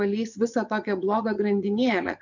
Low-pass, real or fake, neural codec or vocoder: 7.2 kHz; fake; vocoder, 22.05 kHz, 80 mel bands, Vocos